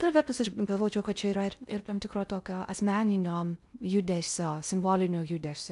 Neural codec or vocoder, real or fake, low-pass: codec, 16 kHz in and 24 kHz out, 0.6 kbps, FocalCodec, streaming, 2048 codes; fake; 10.8 kHz